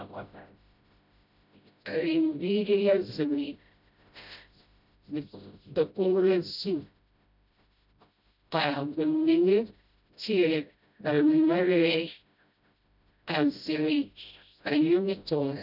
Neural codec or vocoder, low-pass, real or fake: codec, 16 kHz, 0.5 kbps, FreqCodec, smaller model; 5.4 kHz; fake